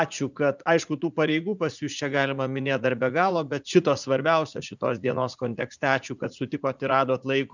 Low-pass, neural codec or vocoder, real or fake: 7.2 kHz; vocoder, 44.1 kHz, 80 mel bands, Vocos; fake